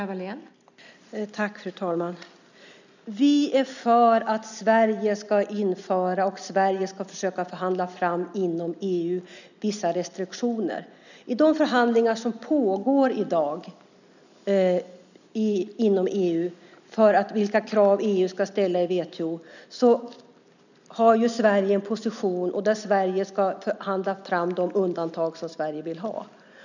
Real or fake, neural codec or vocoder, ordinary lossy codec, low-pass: real; none; none; 7.2 kHz